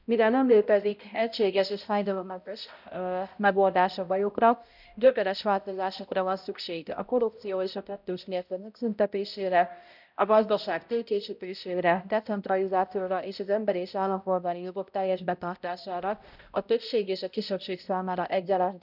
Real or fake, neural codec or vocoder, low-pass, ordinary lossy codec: fake; codec, 16 kHz, 0.5 kbps, X-Codec, HuBERT features, trained on balanced general audio; 5.4 kHz; none